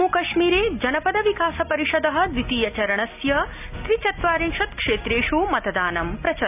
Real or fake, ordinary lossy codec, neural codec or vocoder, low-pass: real; none; none; 3.6 kHz